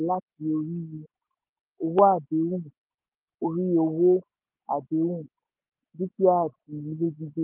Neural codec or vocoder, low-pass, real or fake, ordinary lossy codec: none; 3.6 kHz; real; Opus, 32 kbps